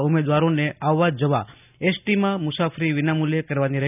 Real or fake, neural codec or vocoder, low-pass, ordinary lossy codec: real; none; 3.6 kHz; none